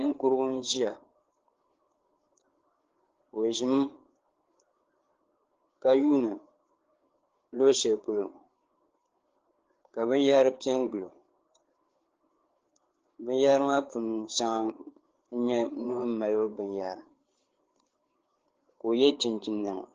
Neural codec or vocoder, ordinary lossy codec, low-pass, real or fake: codec, 16 kHz, 4 kbps, FreqCodec, larger model; Opus, 16 kbps; 7.2 kHz; fake